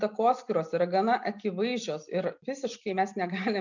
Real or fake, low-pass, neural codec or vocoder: real; 7.2 kHz; none